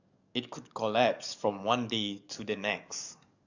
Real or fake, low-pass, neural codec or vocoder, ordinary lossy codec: fake; 7.2 kHz; codec, 44.1 kHz, 7.8 kbps, DAC; none